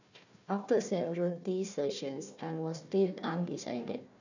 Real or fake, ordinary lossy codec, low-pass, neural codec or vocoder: fake; none; 7.2 kHz; codec, 16 kHz, 1 kbps, FunCodec, trained on Chinese and English, 50 frames a second